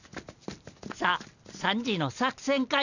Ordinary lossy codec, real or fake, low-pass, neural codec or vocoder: none; real; 7.2 kHz; none